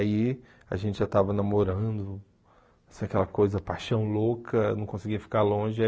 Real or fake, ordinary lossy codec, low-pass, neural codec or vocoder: real; none; none; none